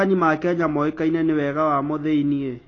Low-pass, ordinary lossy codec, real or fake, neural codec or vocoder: 7.2 kHz; AAC, 32 kbps; real; none